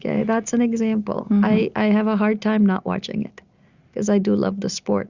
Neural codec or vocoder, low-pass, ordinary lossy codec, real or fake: none; 7.2 kHz; Opus, 64 kbps; real